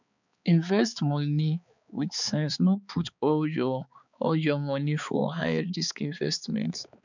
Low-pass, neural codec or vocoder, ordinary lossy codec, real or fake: 7.2 kHz; codec, 16 kHz, 4 kbps, X-Codec, HuBERT features, trained on balanced general audio; none; fake